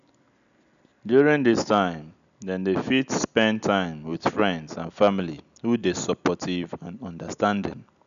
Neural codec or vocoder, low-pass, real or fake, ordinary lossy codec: none; 7.2 kHz; real; none